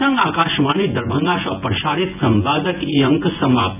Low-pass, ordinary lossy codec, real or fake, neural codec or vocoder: 3.6 kHz; none; fake; vocoder, 24 kHz, 100 mel bands, Vocos